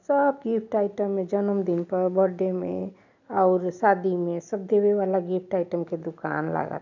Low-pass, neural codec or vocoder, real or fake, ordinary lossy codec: 7.2 kHz; none; real; none